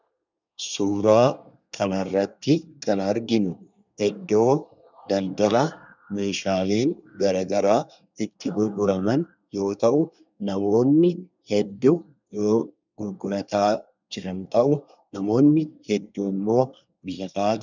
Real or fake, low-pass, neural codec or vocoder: fake; 7.2 kHz; codec, 24 kHz, 1 kbps, SNAC